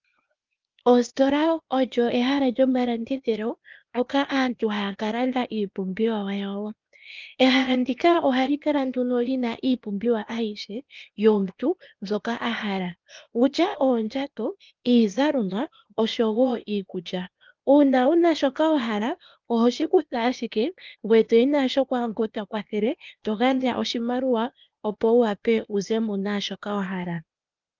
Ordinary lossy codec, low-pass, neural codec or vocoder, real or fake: Opus, 24 kbps; 7.2 kHz; codec, 16 kHz, 0.8 kbps, ZipCodec; fake